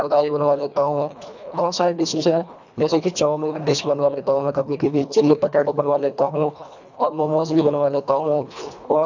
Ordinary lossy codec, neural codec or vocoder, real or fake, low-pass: none; codec, 24 kHz, 1.5 kbps, HILCodec; fake; 7.2 kHz